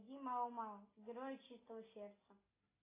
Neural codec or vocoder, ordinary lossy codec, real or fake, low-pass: none; AAC, 16 kbps; real; 3.6 kHz